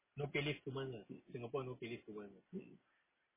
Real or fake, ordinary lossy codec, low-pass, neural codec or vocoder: real; MP3, 16 kbps; 3.6 kHz; none